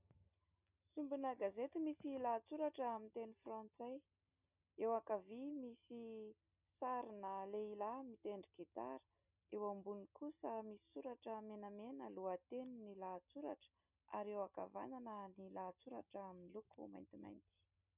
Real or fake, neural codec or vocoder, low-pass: real; none; 3.6 kHz